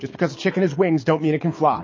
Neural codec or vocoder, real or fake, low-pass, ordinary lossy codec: codec, 24 kHz, 6 kbps, HILCodec; fake; 7.2 kHz; MP3, 32 kbps